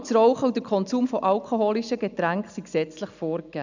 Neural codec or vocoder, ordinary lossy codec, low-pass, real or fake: none; none; 7.2 kHz; real